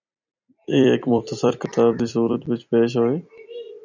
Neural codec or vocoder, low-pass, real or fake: vocoder, 44.1 kHz, 128 mel bands every 256 samples, BigVGAN v2; 7.2 kHz; fake